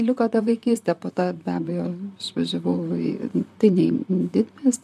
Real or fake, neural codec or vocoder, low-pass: fake; vocoder, 44.1 kHz, 128 mel bands, Pupu-Vocoder; 14.4 kHz